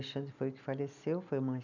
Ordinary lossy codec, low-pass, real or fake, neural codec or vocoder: none; 7.2 kHz; real; none